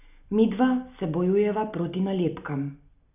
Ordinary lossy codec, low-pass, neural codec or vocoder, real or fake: none; 3.6 kHz; none; real